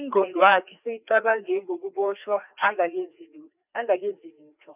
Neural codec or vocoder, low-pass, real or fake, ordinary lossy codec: codec, 16 kHz, 2 kbps, FreqCodec, larger model; 3.6 kHz; fake; none